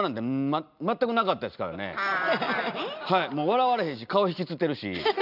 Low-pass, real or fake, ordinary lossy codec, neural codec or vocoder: 5.4 kHz; real; none; none